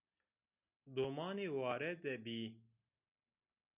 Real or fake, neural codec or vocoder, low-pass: real; none; 3.6 kHz